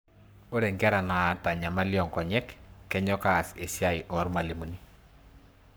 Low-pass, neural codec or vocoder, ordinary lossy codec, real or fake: none; codec, 44.1 kHz, 7.8 kbps, Pupu-Codec; none; fake